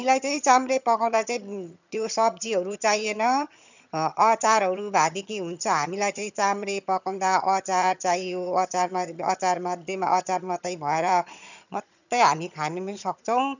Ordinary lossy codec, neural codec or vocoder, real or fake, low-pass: none; vocoder, 22.05 kHz, 80 mel bands, HiFi-GAN; fake; 7.2 kHz